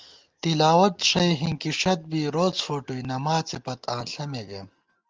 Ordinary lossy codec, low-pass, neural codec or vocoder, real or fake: Opus, 24 kbps; 7.2 kHz; none; real